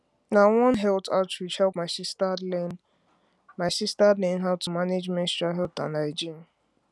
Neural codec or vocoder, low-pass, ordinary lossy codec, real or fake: none; none; none; real